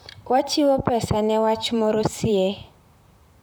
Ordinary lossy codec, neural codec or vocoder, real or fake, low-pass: none; vocoder, 44.1 kHz, 128 mel bands, Pupu-Vocoder; fake; none